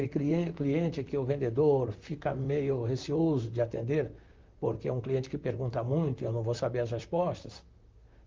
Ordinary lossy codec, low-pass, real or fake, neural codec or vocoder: Opus, 24 kbps; 7.2 kHz; fake; vocoder, 44.1 kHz, 128 mel bands, Pupu-Vocoder